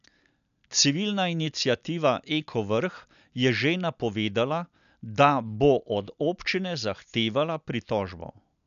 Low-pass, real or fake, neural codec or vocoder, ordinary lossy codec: 7.2 kHz; real; none; none